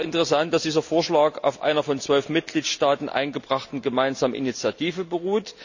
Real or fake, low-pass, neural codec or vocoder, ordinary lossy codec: real; 7.2 kHz; none; none